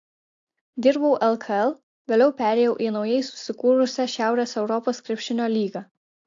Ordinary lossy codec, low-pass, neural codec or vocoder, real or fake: AAC, 48 kbps; 7.2 kHz; none; real